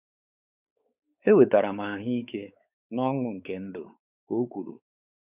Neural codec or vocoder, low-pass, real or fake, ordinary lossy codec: codec, 16 kHz, 4 kbps, X-Codec, WavLM features, trained on Multilingual LibriSpeech; 3.6 kHz; fake; none